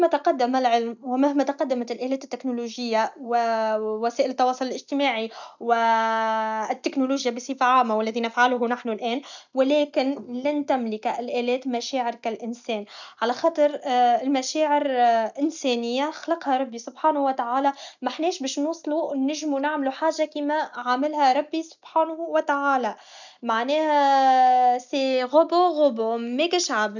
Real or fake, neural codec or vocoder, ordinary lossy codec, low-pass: real; none; none; 7.2 kHz